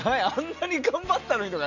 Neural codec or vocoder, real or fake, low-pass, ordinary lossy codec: none; real; 7.2 kHz; none